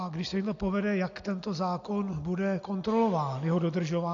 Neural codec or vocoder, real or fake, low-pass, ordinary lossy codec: none; real; 7.2 kHz; AAC, 48 kbps